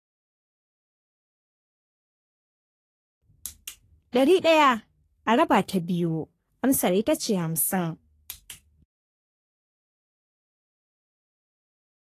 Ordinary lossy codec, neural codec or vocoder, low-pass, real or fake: AAC, 48 kbps; codec, 44.1 kHz, 3.4 kbps, Pupu-Codec; 14.4 kHz; fake